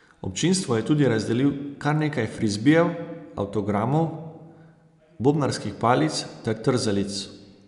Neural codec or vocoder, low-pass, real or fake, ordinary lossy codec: none; 10.8 kHz; real; none